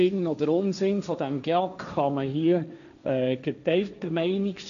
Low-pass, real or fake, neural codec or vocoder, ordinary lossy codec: 7.2 kHz; fake; codec, 16 kHz, 1.1 kbps, Voila-Tokenizer; none